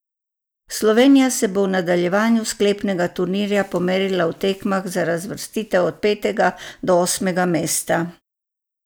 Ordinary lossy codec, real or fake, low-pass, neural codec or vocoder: none; real; none; none